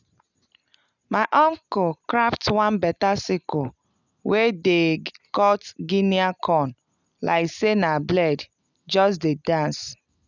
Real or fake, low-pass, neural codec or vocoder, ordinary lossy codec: real; 7.2 kHz; none; none